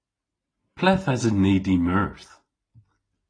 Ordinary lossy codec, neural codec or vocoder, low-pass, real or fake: AAC, 32 kbps; none; 9.9 kHz; real